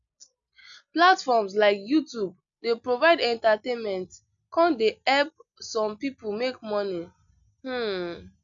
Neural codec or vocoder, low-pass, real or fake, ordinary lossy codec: none; 7.2 kHz; real; none